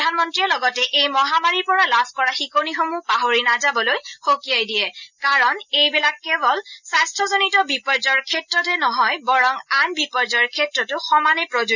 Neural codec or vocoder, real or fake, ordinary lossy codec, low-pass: none; real; none; 7.2 kHz